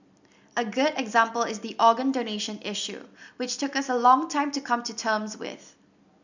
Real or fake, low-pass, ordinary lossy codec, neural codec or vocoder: real; 7.2 kHz; none; none